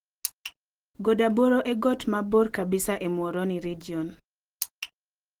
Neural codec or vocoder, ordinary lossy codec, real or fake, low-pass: none; Opus, 16 kbps; real; 19.8 kHz